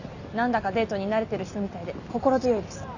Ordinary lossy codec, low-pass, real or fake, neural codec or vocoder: none; 7.2 kHz; real; none